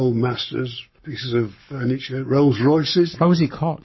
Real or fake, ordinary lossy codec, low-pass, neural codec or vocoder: fake; MP3, 24 kbps; 7.2 kHz; codec, 44.1 kHz, 7.8 kbps, Pupu-Codec